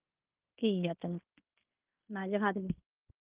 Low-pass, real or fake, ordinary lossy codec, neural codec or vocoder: 3.6 kHz; fake; Opus, 24 kbps; codec, 16 kHz in and 24 kHz out, 0.9 kbps, LongCat-Audio-Codec, fine tuned four codebook decoder